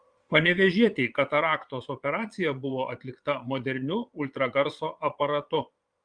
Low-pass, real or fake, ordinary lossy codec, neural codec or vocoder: 9.9 kHz; fake; Opus, 32 kbps; vocoder, 22.05 kHz, 80 mel bands, Vocos